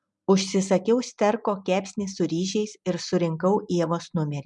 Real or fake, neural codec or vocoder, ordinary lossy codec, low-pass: real; none; MP3, 96 kbps; 10.8 kHz